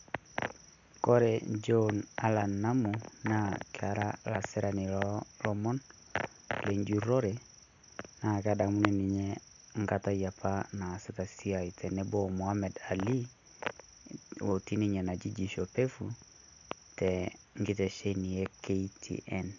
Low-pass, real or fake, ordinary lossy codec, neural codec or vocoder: 7.2 kHz; real; none; none